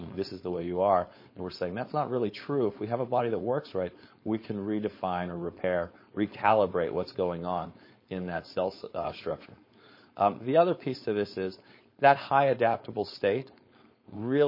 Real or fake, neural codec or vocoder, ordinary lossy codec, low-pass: fake; codec, 16 kHz, 4.8 kbps, FACodec; MP3, 24 kbps; 5.4 kHz